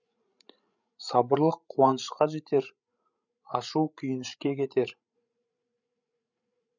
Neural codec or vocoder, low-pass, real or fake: codec, 16 kHz, 16 kbps, FreqCodec, larger model; 7.2 kHz; fake